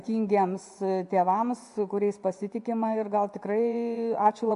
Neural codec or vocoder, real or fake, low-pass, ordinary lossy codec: vocoder, 24 kHz, 100 mel bands, Vocos; fake; 10.8 kHz; MP3, 64 kbps